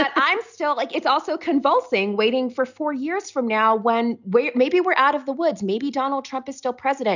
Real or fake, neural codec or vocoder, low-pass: real; none; 7.2 kHz